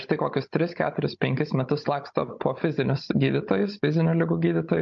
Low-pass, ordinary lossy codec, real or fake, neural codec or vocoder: 7.2 kHz; MP3, 48 kbps; fake; codec, 16 kHz, 16 kbps, FunCodec, trained on Chinese and English, 50 frames a second